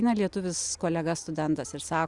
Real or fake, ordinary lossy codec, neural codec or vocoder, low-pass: real; Opus, 64 kbps; none; 10.8 kHz